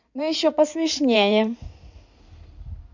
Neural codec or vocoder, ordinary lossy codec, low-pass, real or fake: codec, 16 kHz in and 24 kHz out, 2.2 kbps, FireRedTTS-2 codec; none; 7.2 kHz; fake